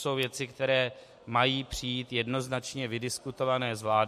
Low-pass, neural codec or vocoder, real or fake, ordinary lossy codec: 14.4 kHz; none; real; MP3, 64 kbps